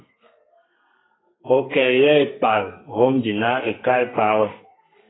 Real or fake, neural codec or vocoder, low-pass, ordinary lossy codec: fake; codec, 32 kHz, 1.9 kbps, SNAC; 7.2 kHz; AAC, 16 kbps